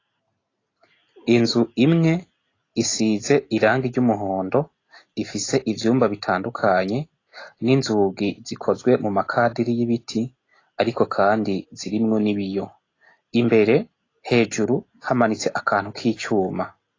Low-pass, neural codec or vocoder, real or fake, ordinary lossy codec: 7.2 kHz; none; real; AAC, 32 kbps